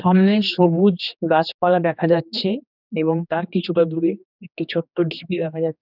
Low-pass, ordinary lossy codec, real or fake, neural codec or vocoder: 5.4 kHz; none; fake; codec, 16 kHz, 2 kbps, X-Codec, HuBERT features, trained on general audio